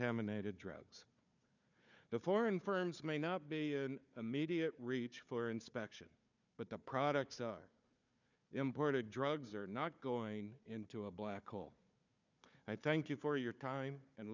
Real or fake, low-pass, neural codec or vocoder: fake; 7.2 kHz; codec, 16 kHz, 4 kbps, FunCodec, trained on Chinese and English, 50 frames a second